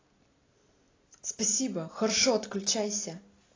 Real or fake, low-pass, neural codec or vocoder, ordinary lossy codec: real; 7.2 kHz; none; AAC, 32 kbps